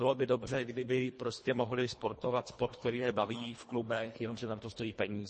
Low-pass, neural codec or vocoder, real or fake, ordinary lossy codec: 10.8 kHz; codec, 24 kHz, 1.5 kbps, HILCodec; fake; MP3, 32 kbps